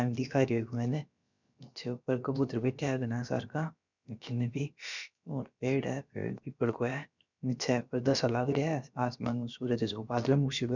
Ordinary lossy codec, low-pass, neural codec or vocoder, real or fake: none; 7.2 kHz; codec, 16 kHz, about 1 kbps, DyCAST, with the encoder's durations; fake